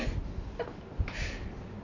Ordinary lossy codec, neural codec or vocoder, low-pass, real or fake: none; none; 7.2 kHz; real